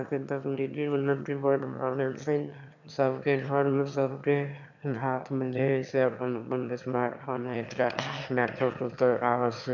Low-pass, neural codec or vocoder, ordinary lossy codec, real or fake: 7.2 kHz; autoencoder, 22.05 kHz, a latent of 192 numbers a frame, VITS, trained on one speaker; none; fake